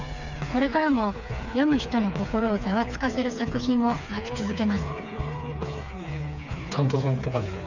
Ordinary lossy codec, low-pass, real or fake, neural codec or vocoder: none; 7.2 kHz; fake; codec, 16 kHz, 4 kbps, FreqCodec, smaller model